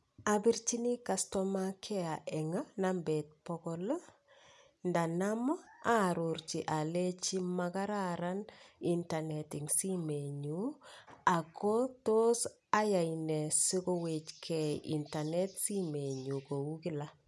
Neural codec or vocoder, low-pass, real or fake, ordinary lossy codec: none; none; real; none